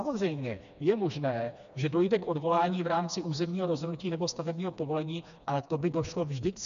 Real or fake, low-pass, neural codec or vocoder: fake; 7.2 kHz; codec, 16 kHz, 2 kbps, FreqCodec, smaller model